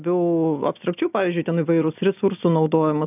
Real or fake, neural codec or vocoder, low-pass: real; none; 3.6 kHz